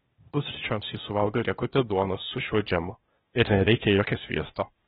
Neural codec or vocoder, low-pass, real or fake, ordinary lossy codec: codec, 16 kHz, 0.8 kbps, ZipCodec; 7.2 kHz; fake; AAC, 16 kbps